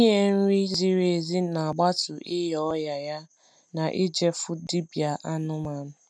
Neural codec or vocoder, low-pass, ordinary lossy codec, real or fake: none; none; none; real